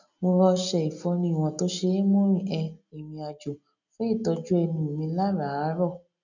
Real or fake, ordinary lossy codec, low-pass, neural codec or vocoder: real; none; 7.2 kHz; none